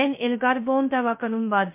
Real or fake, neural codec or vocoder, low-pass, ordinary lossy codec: fake; codec, 16 kHz, 0.2 kbps, FocalCodec; 3.6 kHz; MP3, 24 kbps